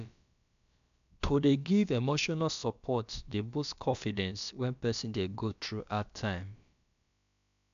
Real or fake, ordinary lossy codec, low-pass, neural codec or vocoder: fake; none; 7.2 kHz; codec, 16 kHz, about 1 kbps, DyCAST, with the encoder's durations